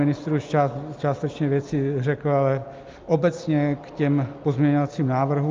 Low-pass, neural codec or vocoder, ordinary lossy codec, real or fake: 7.2 kHz; none; Opus, 24 kbps; real